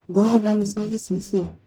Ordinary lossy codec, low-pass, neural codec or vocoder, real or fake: none; none; codec, 44.1 kHz, 0.9 kbps, DAC; fake